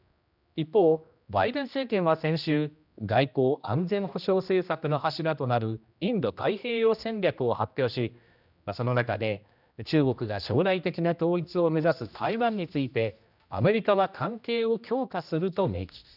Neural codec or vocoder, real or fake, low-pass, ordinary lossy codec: codec, 16 kHz, 1 kbps, X-Codec, HuBERT features, trained on general audio; fake; 5.4 kHz; none